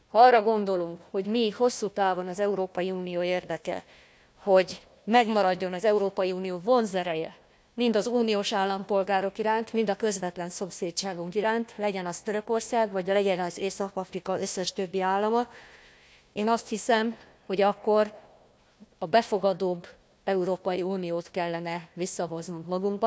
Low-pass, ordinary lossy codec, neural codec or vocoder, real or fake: none; none; codec, 16 kHz, 1 kbps, FunCodec, trained on Chinese and English, 50 frames a second; fake